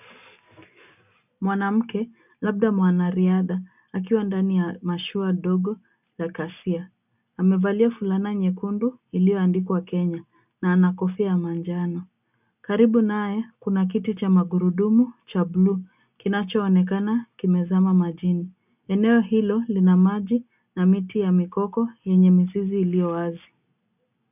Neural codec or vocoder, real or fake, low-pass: none; real; 3.6 kHz